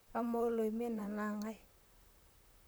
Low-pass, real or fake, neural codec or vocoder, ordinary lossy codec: none; fake; vocoder, 44.1 kHz, 128 mel bands, Pupu-Vocoder; none